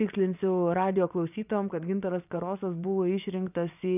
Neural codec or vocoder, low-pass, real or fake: vocoder, 24 kHz, 100 mel bands, Vocos; 3.6 kHz; fake